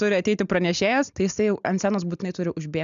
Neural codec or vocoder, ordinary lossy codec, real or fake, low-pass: none; AAC, 96 kbps; real; 7.2 kHz